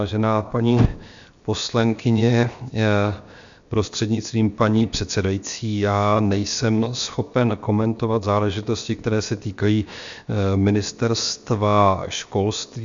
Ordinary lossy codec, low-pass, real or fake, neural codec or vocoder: MP3, 64 kbps; 7.2 kHz; fake; codec, 16 kHz, 0.7 kbps, FocalCodec